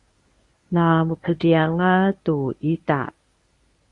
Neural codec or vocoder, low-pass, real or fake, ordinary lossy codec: codec, 24 kHz, 0.9 kbps, WavTokenizer, medium speech release version 1; 10.8 kHz; fake; Opus, 64 kbps